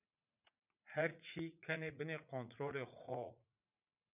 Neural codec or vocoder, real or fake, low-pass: vocoder, 44.1 kHz, 80 mel bands, Vocos; fake; 3.6 kHz